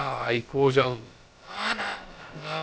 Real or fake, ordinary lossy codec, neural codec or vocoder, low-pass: fake; none; codec, 16 kHz, about 1 kbps, DyCAST, with the encoder's durations; none